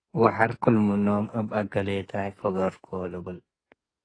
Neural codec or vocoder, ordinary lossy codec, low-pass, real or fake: codec, 44.1 kHz, 2.6 kbps, SNAC; AAC, 32 kbps; 9.9 kHz; fake